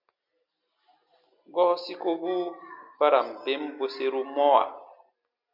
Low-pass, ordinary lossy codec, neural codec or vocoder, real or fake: 5.4 kHz; MP3, 48 kbps; none; real